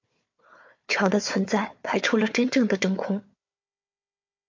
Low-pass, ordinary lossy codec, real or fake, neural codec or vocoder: 7.2 kHz; MP3, 48 kbps; fake; codec, 16 kHz, 4 kbps, FunCodec, trained on Chinese and English, 50 frames a second